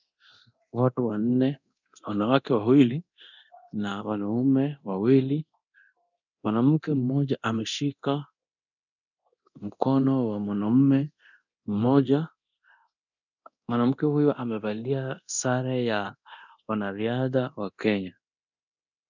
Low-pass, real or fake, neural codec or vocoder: 7.2 kHz; fake; codec, 24 kHz, 0.9 kbps, DualCodec